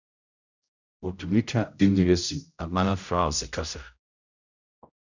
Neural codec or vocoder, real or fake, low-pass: codec, 16 kHz, 0.5 kbps, X-Codec, HuBERT features, trained on general audio; fake; 7.2 kHz